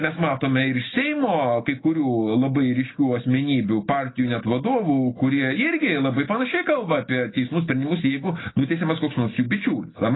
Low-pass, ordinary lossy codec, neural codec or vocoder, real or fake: 7.2 kHz; AAC, 16 kbps; none; real